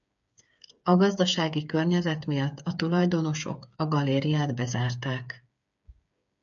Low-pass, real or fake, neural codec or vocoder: 7.2 kHz; fake; codec, 16 kHz, 8 kbps, FreqCodec, smaller model